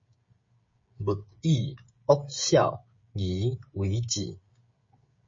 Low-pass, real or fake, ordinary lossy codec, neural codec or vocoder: 7.2 kHz; fake; MP3, 32 kbps; codec, 16 kHz, 16 kbps, FreqCodec, smaller model